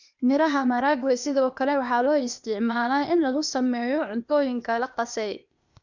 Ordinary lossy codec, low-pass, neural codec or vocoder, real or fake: none; 7.2 kHz; codec, 16 kHz, 0.8 kbps, ZipCodec; fake